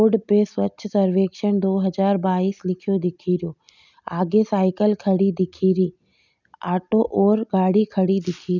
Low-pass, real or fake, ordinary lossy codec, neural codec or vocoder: 7.2 kHz; real; none; none